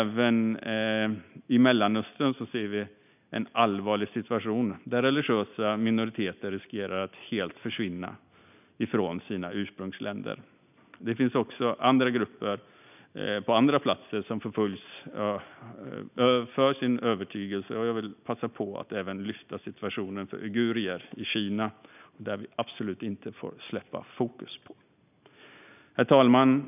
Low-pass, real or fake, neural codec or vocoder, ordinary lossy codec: 3.6 kHz; real; none; none